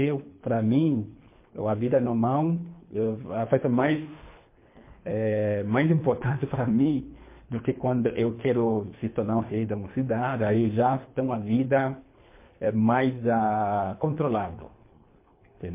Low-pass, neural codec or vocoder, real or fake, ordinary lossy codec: 3.6 kHz; codec, 24 kHz, 3 kbps, HILCodec; fake; MP3, 24 kbps